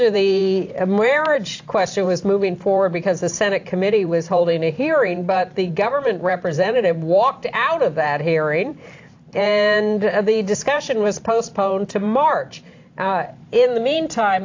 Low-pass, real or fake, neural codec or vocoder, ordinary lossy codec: 7.2 kHz; fake; vocoder, 44.1 kHz, 128 mel bands every 512 samples, BigVGAN v2; AAC, 48 kbps